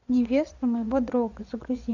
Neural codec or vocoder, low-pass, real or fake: vocoder, 24 kHz, 100 mel bands, Vocos; 7.2 kHz; fake